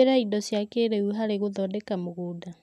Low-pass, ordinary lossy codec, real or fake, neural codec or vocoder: 14.4 kHz; none; real; none